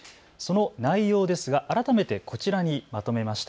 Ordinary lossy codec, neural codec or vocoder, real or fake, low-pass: none; none; real; none